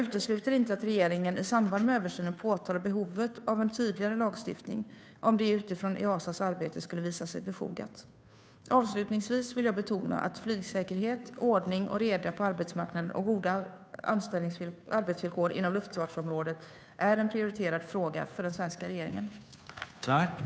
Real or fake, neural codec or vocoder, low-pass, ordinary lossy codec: fake; codec, 16 kHz, 2 kbps, FunCodec, trained on Chinese and English, 25 frames a second; none; none